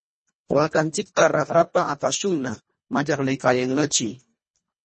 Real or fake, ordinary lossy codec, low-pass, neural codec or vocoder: fake; MP3, 32 kbps; 10.8 kHz; codec, 24 kHz, 1.5 kbps, HILCodec